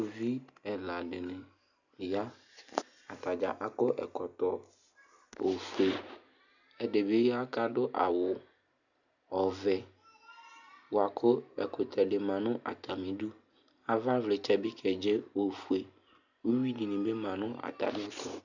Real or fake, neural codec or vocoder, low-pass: real; none; 7.2 kHz